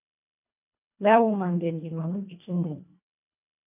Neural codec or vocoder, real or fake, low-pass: codec, 24 kHz, 1.5 kbps, HILCodec; fake; 3.6 kHz